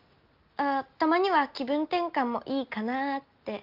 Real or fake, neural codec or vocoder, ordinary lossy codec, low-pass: real; none; Opus, 32 kbps; 5.4 kHz